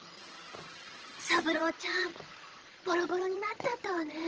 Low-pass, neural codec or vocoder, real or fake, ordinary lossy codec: 7.2 kHz; vocoder, 22.05 kHz, 80 mel bands, HiFi-GAN; fake; Opus, 16 kbps